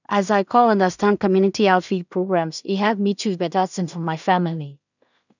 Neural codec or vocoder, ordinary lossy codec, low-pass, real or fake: codec, 16 kHz in and 24 kHz out, 0.4 kbps, LongCat-Audio-Codec, two codebook decoder; none; 7.2 kHz; fake